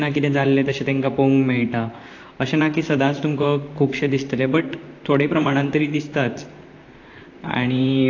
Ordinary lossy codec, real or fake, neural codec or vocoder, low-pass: AAC, 48 kbps; fake; vocoder, 44.1 kHz, 128 mel bands, Pupu-Vocoder; 7.2 kHz